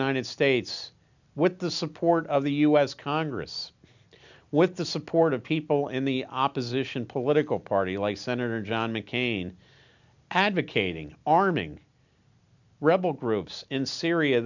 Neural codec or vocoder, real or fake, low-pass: none; real; 7.2 kHz